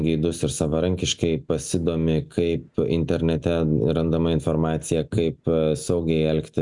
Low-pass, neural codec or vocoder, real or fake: 10.8 kHz; none; real